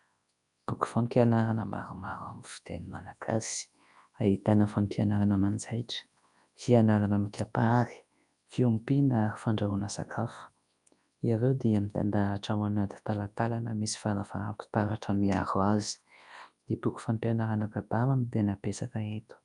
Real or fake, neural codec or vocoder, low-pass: fake; codec, 24 kHz, 0.9 kbps, WavTokenizer, large speech release; 10.8 kHz